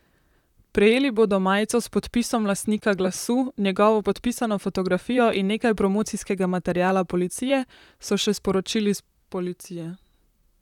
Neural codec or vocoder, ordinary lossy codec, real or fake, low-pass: vocoder, 44.1 kHz, 128 mel bands, Pupu-Vocoder; none; fake; 19.8 kHz